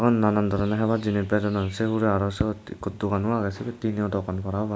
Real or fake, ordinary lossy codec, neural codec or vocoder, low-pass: real; none; none; none